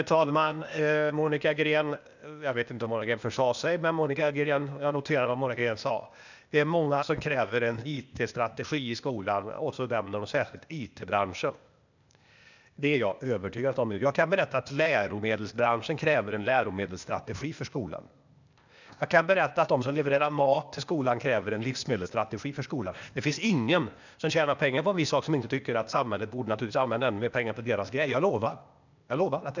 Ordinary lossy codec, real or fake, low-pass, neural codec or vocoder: none; fake; 7.2 kHz; codec, 16 kHz, 0.8 kbps, ZipCodec